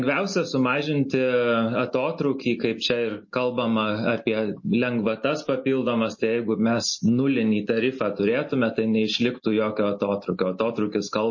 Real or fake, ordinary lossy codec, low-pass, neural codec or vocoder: real; MP3, 32 kbps; 7.2 kHz; none